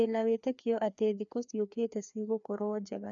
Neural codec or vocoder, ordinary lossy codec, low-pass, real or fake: codec, 16 kHz, 2 kbps, FreqCodec, larger model; none; 7.2 kHz; fake